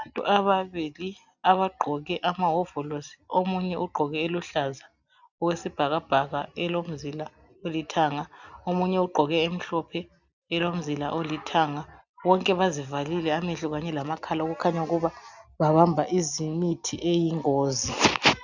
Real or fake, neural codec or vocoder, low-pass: real; none; 7.2 kHz